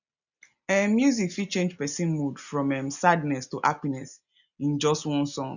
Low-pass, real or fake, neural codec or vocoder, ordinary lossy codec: 7.2 kHz; real; none; none